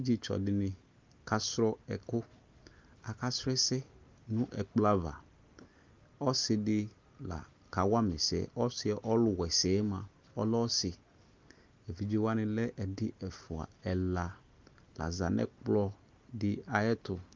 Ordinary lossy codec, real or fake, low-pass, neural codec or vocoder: Opus, 32 kbps; real; 7.2 kHz; none